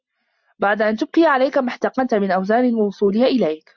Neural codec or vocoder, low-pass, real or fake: none; 7.2 kHz; real